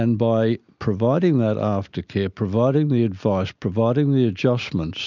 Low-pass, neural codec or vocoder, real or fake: 7.2 kHz; none; real